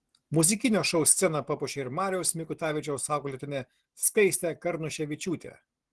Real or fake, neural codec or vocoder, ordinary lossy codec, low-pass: real; none; Opus, 16 kbps; 10.8 kHz